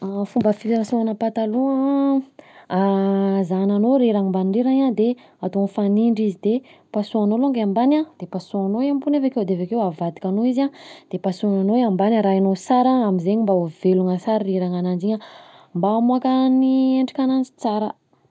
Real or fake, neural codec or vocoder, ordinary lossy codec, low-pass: real; none; none; none